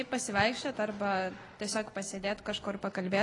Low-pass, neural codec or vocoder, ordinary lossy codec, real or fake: 10.8 kHz; none; AAC, 32 kbps; real